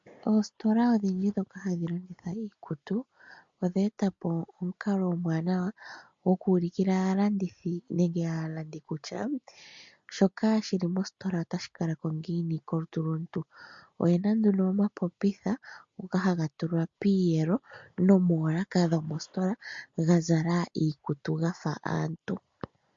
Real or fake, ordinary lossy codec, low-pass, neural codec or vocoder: real; MP3, 48 kbps; 7.2 kHz; none